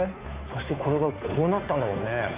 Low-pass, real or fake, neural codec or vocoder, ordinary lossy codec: 3.6 kHz; fake; codec, 16 kHz, 2 kbps, FunCodec, trained on Chinese and English, 25 frames a second; Opus, 64 kbps